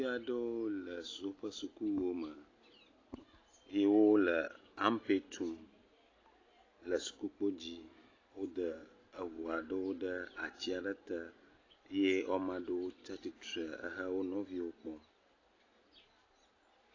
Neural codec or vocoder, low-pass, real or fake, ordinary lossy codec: none; 7.2 kHz; real; AAC, 32 kbps